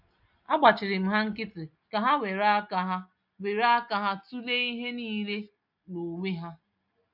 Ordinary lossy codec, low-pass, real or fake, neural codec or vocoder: none; 5.4 kHz; real; none